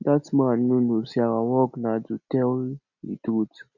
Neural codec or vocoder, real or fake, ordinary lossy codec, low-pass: none; real; none; 7.2 kHz